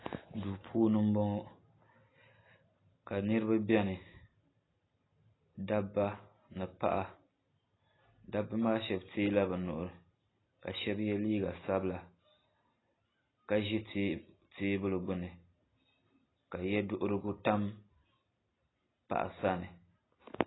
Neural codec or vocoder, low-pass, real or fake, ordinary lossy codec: none; 7.2 kHz; real; AAC, 16 kbps